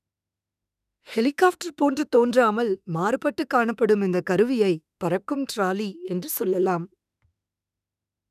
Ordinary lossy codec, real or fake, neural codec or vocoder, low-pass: none; fake; autoencoder, 48 kHz, 32 numbers a frame, DAC-VAE, trained on Japanese speech; 14.4 kHz